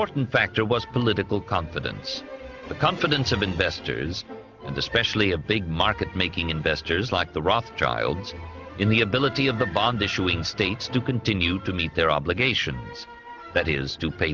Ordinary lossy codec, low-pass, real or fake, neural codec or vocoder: Opus, 32 kbps; 7.2 kHz; real; none